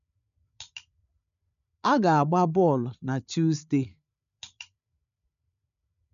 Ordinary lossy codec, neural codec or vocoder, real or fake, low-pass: none; codec, 16 kHz, 8 kbps, FreqCodec, larger model; fake; 7.2 kHz